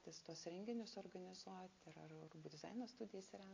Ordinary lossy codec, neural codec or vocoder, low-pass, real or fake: AAC, 32 kbps; none; 7.2 kHz; real